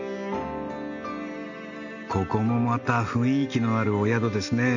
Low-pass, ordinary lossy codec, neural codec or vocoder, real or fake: 7.2 kHz; none; none; real